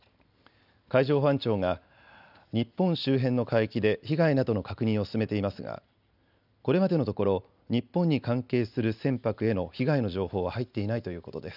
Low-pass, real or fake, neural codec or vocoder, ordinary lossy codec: 5.4 kHz; real; none; none